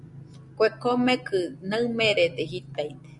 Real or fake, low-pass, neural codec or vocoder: real; 10.8 kHz; none